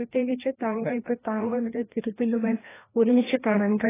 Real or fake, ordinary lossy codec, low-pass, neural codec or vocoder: fake; AAC, 16 kbps; 3.6 kHz; codec, 16 kHz, 1 kbps, FreqCodec, larger model